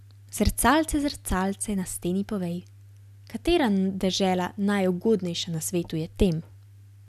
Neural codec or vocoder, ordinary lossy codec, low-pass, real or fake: none; none; 14.4 kHz; real